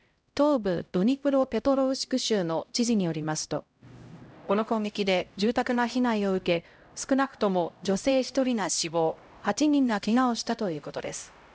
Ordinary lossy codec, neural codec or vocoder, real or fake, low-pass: none; codec, 16 kHz, 0.5 kbps, X-Codec, HuBERT features, trained on LibriSpeech; fake; none